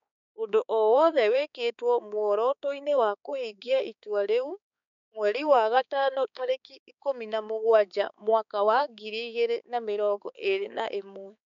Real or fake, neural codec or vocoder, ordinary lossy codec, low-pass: fake; codec, 16 kHz, 4 kbps, X-Codec, HuBERT features, trained on balanced general audio; none; 7.2 kHz